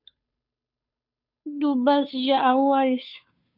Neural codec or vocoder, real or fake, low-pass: codec, 16 kHz, 2 kbps, FunCodec, trained on Chinese and English, 25 frames a second; fake; 5.4 kHz